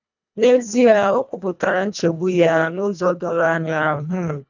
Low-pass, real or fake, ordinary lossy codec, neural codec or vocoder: 7.2 kHz; fake; none; codec, 24 kHz, 1.5 kbps, HILCodec